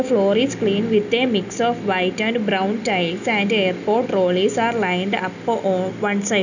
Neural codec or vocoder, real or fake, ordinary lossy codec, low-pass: none; real; none; 7.2 kHz